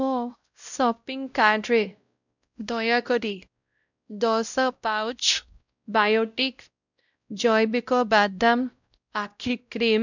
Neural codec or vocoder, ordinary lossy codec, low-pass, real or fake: codec, 16 kHz, 0.5 kbps, X-Codec, WavLM features, trained on Multilingual LibriSpeech; none; 7.2 kHz; fake